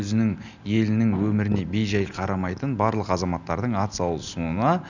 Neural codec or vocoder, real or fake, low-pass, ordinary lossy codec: none; real; 7.2 kHz; none